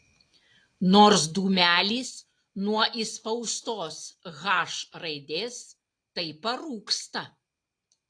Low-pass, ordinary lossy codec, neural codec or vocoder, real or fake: 9.9 kHz; AAC, 48 kbps; none; real